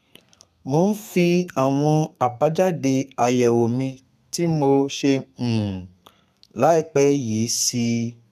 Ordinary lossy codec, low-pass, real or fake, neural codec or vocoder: none; 14.4 kHz; fake; codec, 32 kHz, 1.9 kbps, SNAC